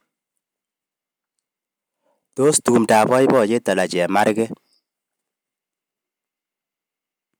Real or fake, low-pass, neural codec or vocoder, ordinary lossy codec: real; none; none; none